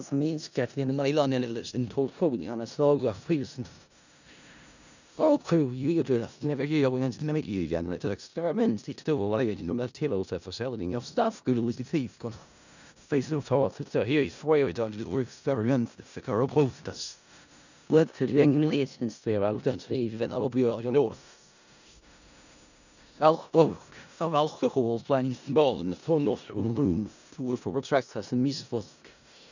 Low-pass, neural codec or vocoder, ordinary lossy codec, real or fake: 7.2 kHz; codec, 16 kHz in and 24 kHz out, 0.4 kbps, LongCat-Audio-Codec, four codebook decoder; none; fake